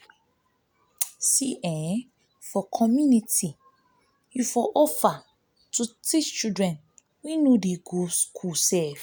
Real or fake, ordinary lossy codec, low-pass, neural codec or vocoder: real; none; none; none